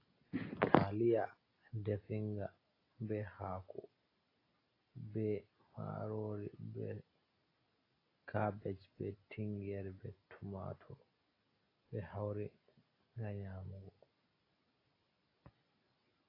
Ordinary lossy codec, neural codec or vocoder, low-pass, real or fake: AAC, 24 kbps; none; 5.4 kHz; real